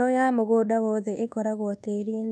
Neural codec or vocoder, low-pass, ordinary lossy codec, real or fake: codec, 24 kHz, 1.2 kbps, DualCodec; none; none; fake